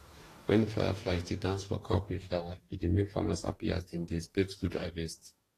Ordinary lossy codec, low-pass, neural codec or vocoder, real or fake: AAC, 48 kbps; 14.4 kHz; codec, 44.1 kHz, 2.6 kbps, DAC; fake